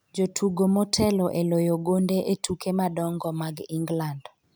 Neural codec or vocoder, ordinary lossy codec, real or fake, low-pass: none; none; real; none